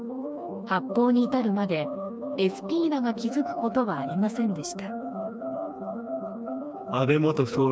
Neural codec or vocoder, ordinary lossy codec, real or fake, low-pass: codec, 16 kHz, 2 kbps, FreqCodec, smaller model; none; fake; none